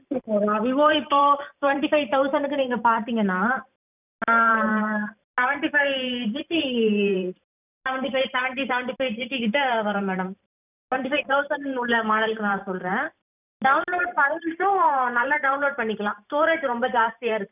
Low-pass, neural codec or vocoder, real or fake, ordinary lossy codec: 3.6 kHz; vocoder, 44.1 kHz, 128 mel bands every 512 samples, BigVGAN v2; fake; none